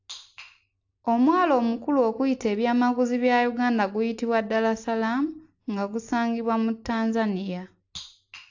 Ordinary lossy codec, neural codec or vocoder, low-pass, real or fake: AAC, 48 kbps; none; 7.2 kHz; real